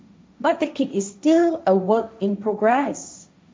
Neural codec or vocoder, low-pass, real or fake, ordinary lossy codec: codec, 16 kHz, 1.1 kbps, Voila-Tokenizer; none; fake; none